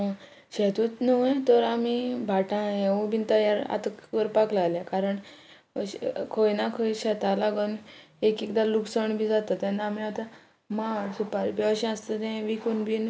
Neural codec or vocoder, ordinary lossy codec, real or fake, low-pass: none; none; real; none